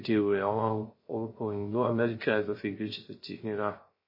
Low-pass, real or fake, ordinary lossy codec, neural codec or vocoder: 5.4 kHz; fake; MP3, 24 kbps; codec, 16 kHz, 0.3 kbps, FocalCodec